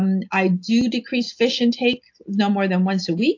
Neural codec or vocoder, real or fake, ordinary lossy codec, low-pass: none; real; AAC, 48 kbps; 7.2 kHz